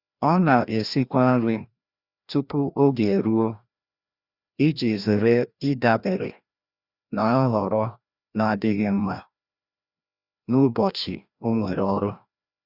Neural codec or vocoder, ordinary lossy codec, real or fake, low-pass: codec, 16 kHz, 1 kbps, FreqCodec, larger model; Opus, 64 kbps; fake; 5.4 kHz